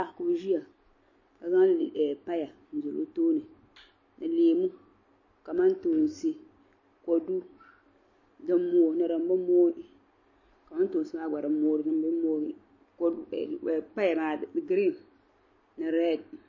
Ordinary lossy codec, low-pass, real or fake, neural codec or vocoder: MP3, 32 kbps; 7.2 kHz; real; none